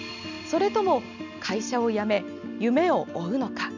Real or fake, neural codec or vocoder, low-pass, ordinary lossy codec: real; none; 7.2 kHz; none